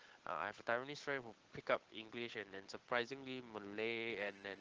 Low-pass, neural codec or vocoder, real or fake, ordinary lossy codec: 7.2 kHz; codec, 16 kHz, 8 kbps, FunCodec, trained on Chinese and English, 25 frames a second; fake; Opus, 16 kbps